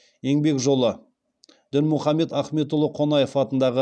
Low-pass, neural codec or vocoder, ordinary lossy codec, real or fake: 9.9 kHz; none; none; real